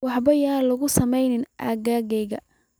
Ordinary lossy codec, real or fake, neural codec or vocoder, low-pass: none; real; none; none